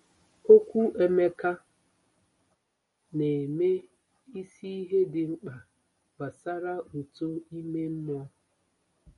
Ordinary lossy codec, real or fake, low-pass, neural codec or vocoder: MP3, 48 kbps; real; 14.4 kHz; none